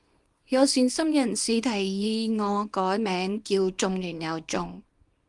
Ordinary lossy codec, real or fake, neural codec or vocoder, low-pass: Opus, 32 kbps; fake; codec, 24 kHz, 0.9 kbps, WavTokenizer, small release; 10.8 kHz